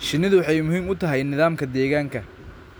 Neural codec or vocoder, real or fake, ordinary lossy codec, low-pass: none; real; none; none